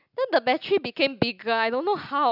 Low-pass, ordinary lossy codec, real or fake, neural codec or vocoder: 5.4 kHz; AAC, 48 kbps; real; none